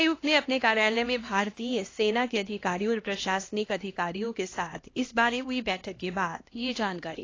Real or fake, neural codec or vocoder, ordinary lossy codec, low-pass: fake; codec, 16 kHz, 1 kbps, X-Codec, HuBERT features, trained on LibriSpeech; AAC, 32 kbps; 7.2 kHz